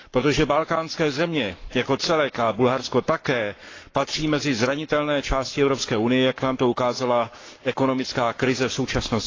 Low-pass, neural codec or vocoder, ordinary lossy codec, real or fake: 7.2 kHz; codec, 44.1 kHz, 7.8 kbps, Pupu-Codec; AAC, 32 kbps; fake